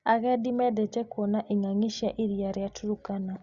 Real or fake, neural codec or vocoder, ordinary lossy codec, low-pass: real; none; Opus, 64 kbps; 7.2 kHz